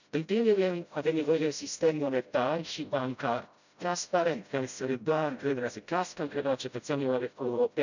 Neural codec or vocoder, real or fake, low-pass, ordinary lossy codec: codec, 16 kHz, 0.5 kbps, FreqCodec, smaller model; fake; 7.2 kHz; none